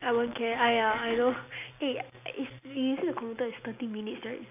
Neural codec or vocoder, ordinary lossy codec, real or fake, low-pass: none; none; real; 3.6 kHz